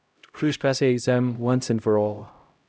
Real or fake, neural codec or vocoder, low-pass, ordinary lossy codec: fake; codec, 16 kHz, 0.5 kbps, X-Codec, HuBERT features, trained on LibriSpeech; none; none